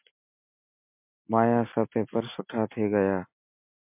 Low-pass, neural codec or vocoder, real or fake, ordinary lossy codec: 3.6 kHz; none; real; MP3, 32 kbps